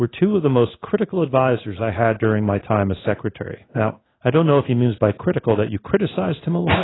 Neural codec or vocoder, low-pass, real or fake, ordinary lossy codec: codec, 16 kHz in and 24 kHz out, 1 kbps, XY-Tokenizer; 7.2 kHz; fake; AAC, 16 kbps